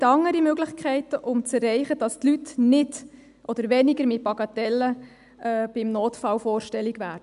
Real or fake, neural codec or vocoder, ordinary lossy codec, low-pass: real; none; none; 10.8 kHz